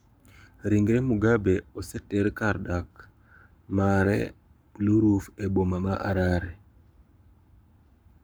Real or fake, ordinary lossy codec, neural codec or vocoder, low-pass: fake; none; codec, 44.1 kHz, 7.8 kbps, Pupu-Codec; none